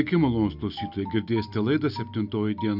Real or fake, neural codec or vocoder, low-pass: real; none; 5.4 kHz